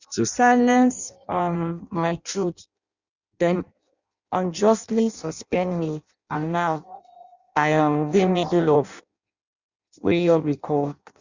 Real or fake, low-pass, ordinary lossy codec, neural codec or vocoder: fake; 7.2 kHz; Opus, 64 kbps; codec, 16 kHz in and 24 kHz out, 0.6 kbps, FireRedTTS-2 codec